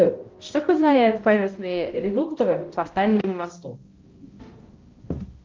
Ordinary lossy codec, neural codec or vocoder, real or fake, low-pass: Opus, 32 kbps; codec, 16 kHz, 0.5 kbps, X-Codec, HuBERT features, trained on balanced general audio; fake; 7.2 kHz